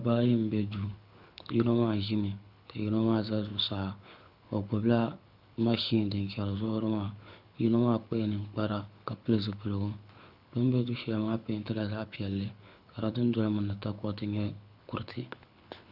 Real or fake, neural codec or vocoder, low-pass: fake; codec, 24 kHz, 6 kbps, HILCodec; 5.4 kHz